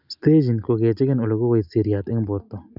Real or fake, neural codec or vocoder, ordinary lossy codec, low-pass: real; none; none; 5.4 kHz